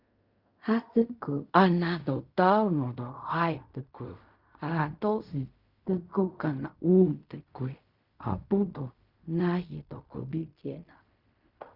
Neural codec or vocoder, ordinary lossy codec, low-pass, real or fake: codec, 16 kHz in and 24 kHz out, 0.4 kbps, LongCat-Audio-Codec, fine tuned four codebook decoder; Opus, 64 kbps; 5.4 kHz; fake